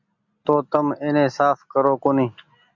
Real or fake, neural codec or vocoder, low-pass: real; none; 7.2 kHz